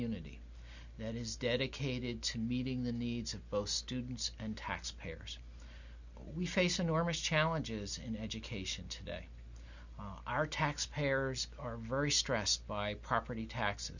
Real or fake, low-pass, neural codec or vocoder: real; 7.2 kHz; none